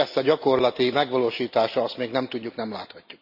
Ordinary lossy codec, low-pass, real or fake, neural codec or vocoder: none; 5.4 kHz; real; none